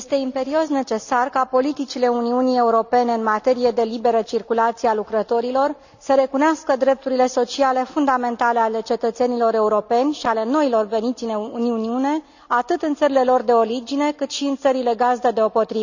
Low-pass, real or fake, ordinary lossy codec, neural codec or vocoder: 7.2 kHz; real; none; none